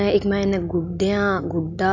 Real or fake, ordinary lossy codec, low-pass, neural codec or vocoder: real; none; 7.2 kHz; none